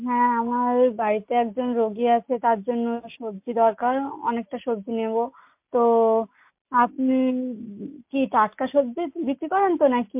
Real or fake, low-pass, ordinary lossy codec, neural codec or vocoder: real; 3.6 kHz; MP3, 32 kbps; none